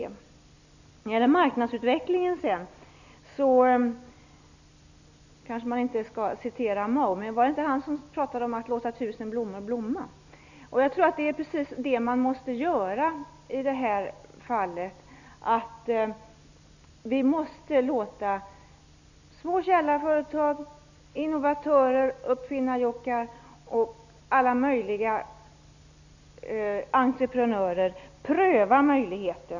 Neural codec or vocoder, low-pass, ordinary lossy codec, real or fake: none; 7.2 kHz; none; real